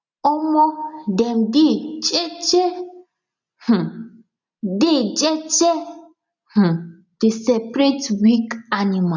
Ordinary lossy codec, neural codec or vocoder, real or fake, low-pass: none; none; real; 7.2 kHz